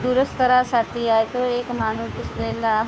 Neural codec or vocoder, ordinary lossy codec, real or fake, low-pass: codec, 16 kHz, 2 kbps, FunCodec, trained on Chinese and English, 25 frames a second; none; fake; none